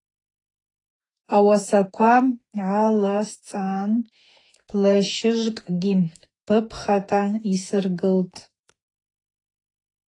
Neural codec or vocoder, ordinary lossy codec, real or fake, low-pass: autoencoder, 48 kHz, 32 numbers a frame, DAC-VAE, trained on Japanese speech; AAC, 32 kbps; fake; 10.8 kHz